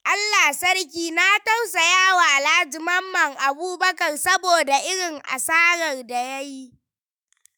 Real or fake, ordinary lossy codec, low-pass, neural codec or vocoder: fake; none; none; autoencoder, 48 kHz, 128 numbers a frame, DAC-VAE, trained on Japanese speech